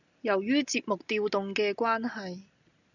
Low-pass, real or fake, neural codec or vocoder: 7.2 kHz; real; none